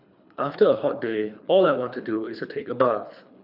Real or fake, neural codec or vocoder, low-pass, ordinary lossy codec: fake; codec, 24 kHz, 3 kbps, HILCodec; 5.4 kHz; none